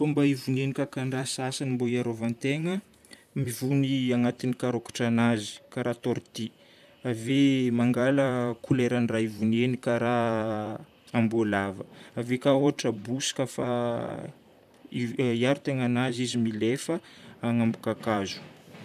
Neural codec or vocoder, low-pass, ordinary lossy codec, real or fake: vocoder, 44.1 kHz, 128 mel bands, Pupu-Vocoder; 14.4 kHz; none; fake